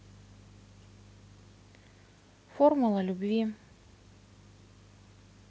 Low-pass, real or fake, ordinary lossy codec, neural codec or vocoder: none; real; none; none